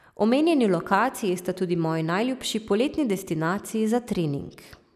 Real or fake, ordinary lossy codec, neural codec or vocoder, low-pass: real; none; none; 14.4 kHz